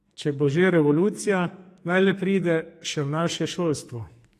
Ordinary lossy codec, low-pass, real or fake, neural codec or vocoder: AAC, 64 kbps; 14.4 kHz; fake; codec, 44.1 kHz, 2.6 kbps, SNAC